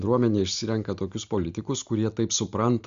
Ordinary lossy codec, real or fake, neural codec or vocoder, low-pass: Opus, 64 kbps; real; none; 7.2 kHz